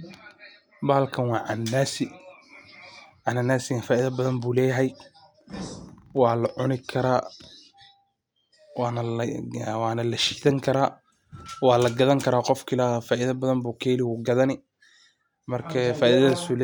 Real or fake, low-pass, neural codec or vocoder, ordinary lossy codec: real; none; none; none